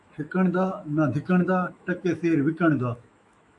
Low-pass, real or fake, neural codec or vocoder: 10.8 kHz; fake; autoencoder, 48 kHz, 128 numbers a frame, DAC-VAE, trained on Japanese speech